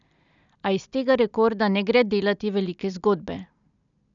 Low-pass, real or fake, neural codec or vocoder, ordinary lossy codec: 7.2 kHz; real; none; none